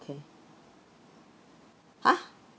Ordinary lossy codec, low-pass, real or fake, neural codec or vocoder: none; none; real; none